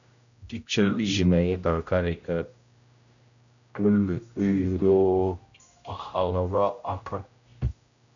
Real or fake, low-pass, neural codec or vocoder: fake; 7.2 kHz; codec, 16 kHz, 0.5 kbps, X-Codec, HuBERT features, trained on general audio